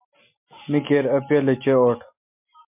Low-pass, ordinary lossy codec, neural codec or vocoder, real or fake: 3.6 kHz; MP3, 32 kbps; none; real